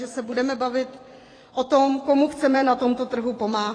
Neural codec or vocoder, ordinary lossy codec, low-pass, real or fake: vocoder, 24 kHz, 100 mel bands, Vocos; AAC, 32 kbps; 9.9 kHz; fake